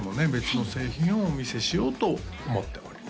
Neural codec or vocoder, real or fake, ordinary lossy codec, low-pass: none; real; none; none